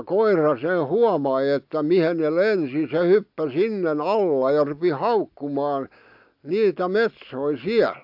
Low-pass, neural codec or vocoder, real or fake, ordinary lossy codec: 5.4 kHz; none; real; AAC, 48 kbps